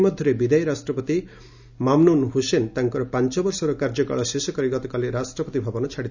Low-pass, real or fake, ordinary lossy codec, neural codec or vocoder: 7.2 kHz; real; none; none